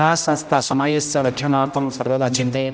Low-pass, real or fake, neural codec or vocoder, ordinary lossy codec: none; fake; codec, 16 kHz, 0.5 kbps, X-Codec, HuBERT features, trained on general audio; none